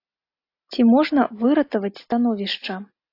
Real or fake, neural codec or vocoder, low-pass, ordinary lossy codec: real; none; 5.4 kHz; AAC, 32 kbps